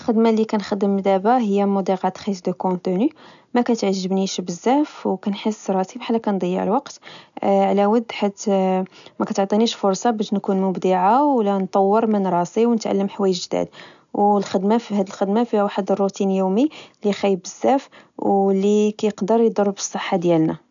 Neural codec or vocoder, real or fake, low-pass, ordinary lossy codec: none; real; 7.2 kHz; none